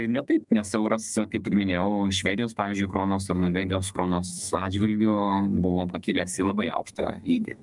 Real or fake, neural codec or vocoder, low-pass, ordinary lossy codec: fake; codec, 32 kHz, 1.9 kbps, SNAC; 10.8 kHz; MP3, 96 kbps